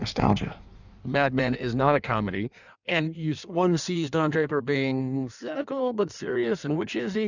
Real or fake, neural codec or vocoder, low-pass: fake; codec, 16 kHz in and 24 kHz out, 1.1 kbps, FireRedTTS-2 codec; 7.2 kHz